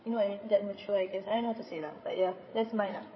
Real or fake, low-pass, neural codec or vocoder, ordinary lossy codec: fake; 7.2 kHz; codec, 16 kHz, 16 kbps, FreqCodec, larger model; MP3, 24 kbps